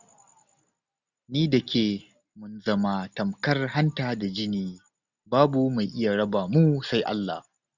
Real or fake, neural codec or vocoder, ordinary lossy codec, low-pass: real; none; none; 7.2 kHz